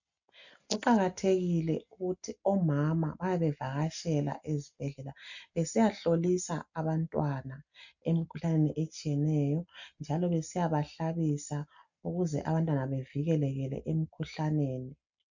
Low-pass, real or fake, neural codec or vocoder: 7.2 kHz; real; none